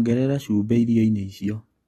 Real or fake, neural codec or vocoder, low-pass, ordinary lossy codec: real; none; 19.8 kHz; AAC, 32 kbps